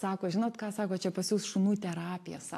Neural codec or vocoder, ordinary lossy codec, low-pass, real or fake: none; AAC, 64 kbps; 14.4 kHz; real